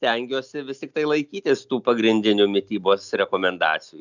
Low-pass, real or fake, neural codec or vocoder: 7.2 kHz; real; none